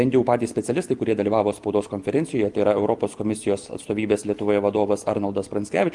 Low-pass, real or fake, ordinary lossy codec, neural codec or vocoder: 10.8 kHz; real; Opus, 24 kbps; none